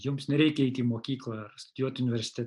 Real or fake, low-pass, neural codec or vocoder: real; 10.8 kHz; none